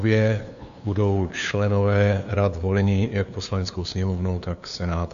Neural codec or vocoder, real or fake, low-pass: codec, 16 kHz, 2 kbps, FunCodec, trained on LibriTTS, 25 frames a second; fake; 7.2 kHz